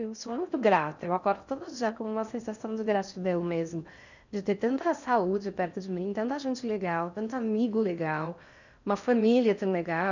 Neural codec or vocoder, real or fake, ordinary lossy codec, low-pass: codec, 16 kHz in and 24 kHz out, 0.6 kbps, FocalCodec, streaming, 4096 codes; fake; none; 7.2 kHz